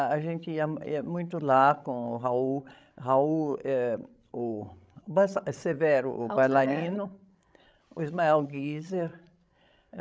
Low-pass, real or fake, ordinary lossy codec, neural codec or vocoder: none; fake; none; codec, 16 kHz, 16 kbps, FreqCodec, larger model